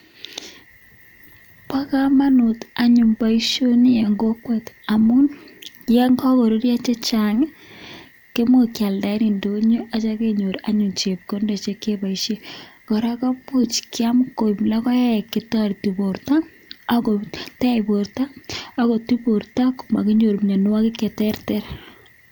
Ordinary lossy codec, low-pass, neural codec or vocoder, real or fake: none; 19.8 kHz; none; real